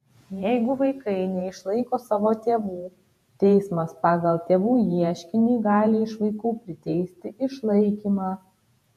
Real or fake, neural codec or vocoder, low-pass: fake; vocoder, 48 kHz, 128 mel bands, Vocos; 14.4 kHz